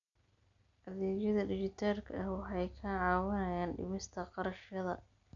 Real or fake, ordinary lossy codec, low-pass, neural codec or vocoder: real; none; 7.2 kHz; none